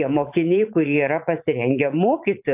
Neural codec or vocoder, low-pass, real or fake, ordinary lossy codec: vocoder, 44.1 kHz, 80 mel bands, Vocos; 3.6 kHz; fake; AAC, 32 kbps